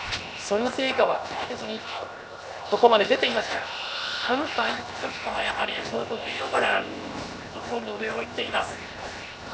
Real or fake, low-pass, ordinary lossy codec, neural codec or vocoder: fake; none; none; codec, 16 kHz, 0.7 kbps, FocalCodec